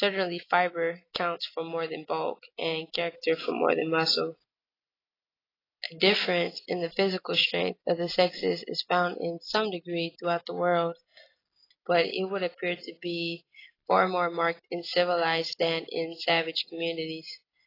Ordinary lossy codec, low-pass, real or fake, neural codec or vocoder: AAC, 24 kbps; 5.4 kHz; real; none